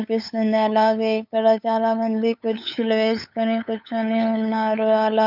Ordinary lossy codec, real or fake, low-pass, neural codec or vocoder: none; fake; 5.4 kHz; codec, 16 kHz, 8 kbps, FunCodec, trained on LibriTTS, 25 frames a second